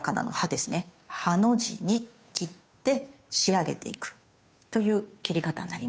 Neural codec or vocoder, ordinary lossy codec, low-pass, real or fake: codec, 16 kHz, 2 kbps, FunCodec, trained on Chinese and English, 25 frames a second; none; none; fake